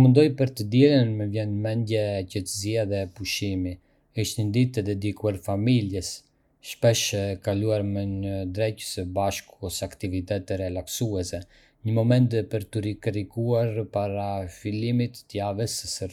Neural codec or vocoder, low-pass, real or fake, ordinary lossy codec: none; 19.8 kHz; real; none